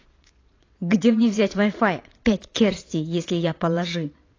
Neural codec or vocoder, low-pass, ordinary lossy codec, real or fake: vocoder, 22.05 kHz, 80 mel bands, Vocos; 7.2 kHz; AAC, 32 kbps; fake